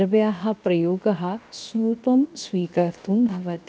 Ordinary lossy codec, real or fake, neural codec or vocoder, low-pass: none; fake; codec, 16 kHz, 0.7 kbps, FocalCodec; none